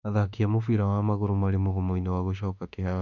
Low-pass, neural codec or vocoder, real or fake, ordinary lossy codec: 7.2 kHz; autoencoder, 48 kHz, 32 numbers a frame, DAC-VAE, trained on Japanese speech; fake; none